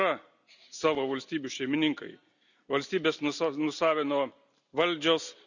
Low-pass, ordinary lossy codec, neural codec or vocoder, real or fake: 7.2 kHz; none; none; real